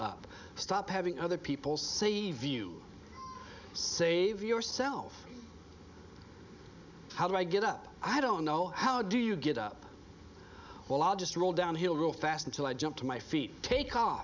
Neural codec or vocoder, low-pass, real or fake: none; 7.2 kHz; real